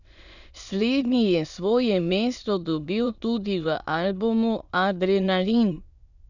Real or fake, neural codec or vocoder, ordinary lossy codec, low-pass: fake; autoencoder, 22.05 kHz, a latent of 192 numbers a frame, VITS, trained on many speakers; none; 7.2 kHz